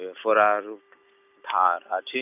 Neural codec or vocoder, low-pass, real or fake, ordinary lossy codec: none; 3.6 kHz; real; none